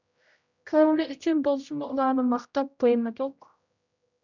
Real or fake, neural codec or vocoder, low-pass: fake; codec, 16 kHz, 0.5 kbps, X-Codec, HuBERT features, trained on general audio; 7.2 kHz